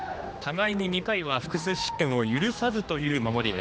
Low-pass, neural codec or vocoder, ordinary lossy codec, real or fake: none; codec, 16 kHz, 2 kbps, X-Codec, HuBERT features, trained on general audio; none; fake